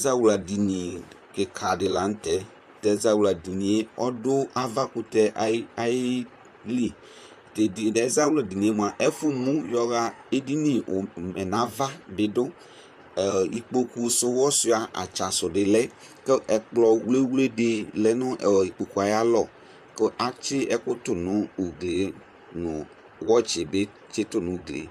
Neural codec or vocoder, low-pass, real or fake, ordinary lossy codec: vocoder, 44.1 kHz, 128 mel bands, Pupu-Vocoder; 14.4 kHz; fake; MP3, 96 kbps